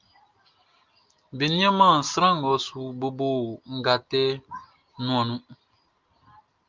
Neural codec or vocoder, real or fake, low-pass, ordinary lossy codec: none; real; 7.2 kHz; Opus, 32 kbps